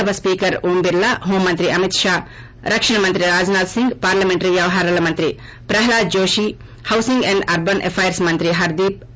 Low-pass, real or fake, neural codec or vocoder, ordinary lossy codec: none; real; none; none